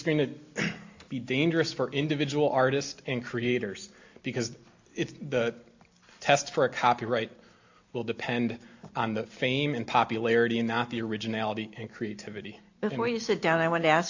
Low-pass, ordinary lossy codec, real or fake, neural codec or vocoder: 7.2 kHz; AAC, 48 kbps; real; none